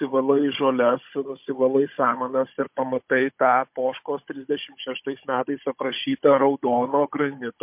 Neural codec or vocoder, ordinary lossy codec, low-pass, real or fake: codec, 16 kHz, 16 kbps, FunCodec, trained on Chinese and English, 50 frames a second; MP3, 32 kbps; 3.6 kHz; fake